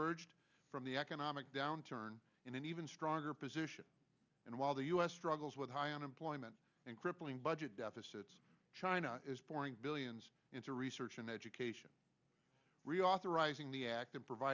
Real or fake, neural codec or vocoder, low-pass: real; none; 7.2 kHz